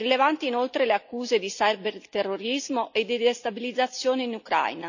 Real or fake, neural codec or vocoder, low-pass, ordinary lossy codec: real; none; 7.2 kHz; none